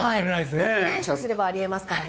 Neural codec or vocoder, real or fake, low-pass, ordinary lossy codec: codec, 16 kHz, 4 kbps, X-Codec, WavLM features, trained on Multilingual LibriSpeech; fake; none; none